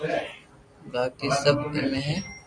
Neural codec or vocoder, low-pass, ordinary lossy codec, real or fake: none; 9.9 kHz; AAC, 64 kbps; real